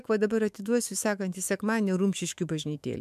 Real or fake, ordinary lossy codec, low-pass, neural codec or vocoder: fake; MP3, 96 kbps; 14.4 kHz; autoencoder, 48 kHz, 128 numbers a frame, DAC-VAE, trained on Japanese speech